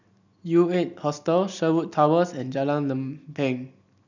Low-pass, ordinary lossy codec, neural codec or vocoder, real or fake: 7.2 kHz; none; none; real